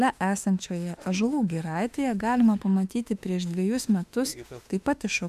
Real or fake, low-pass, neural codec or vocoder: fake; 14.4 kHz; autoencoder, 48 kHz, 32 numbers a frame, DAC-VAE, trained on Japanese speech